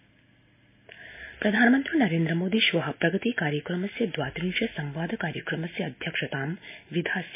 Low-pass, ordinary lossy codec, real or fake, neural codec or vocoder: 3.6 kHz; MP3, 24 kbps; real; none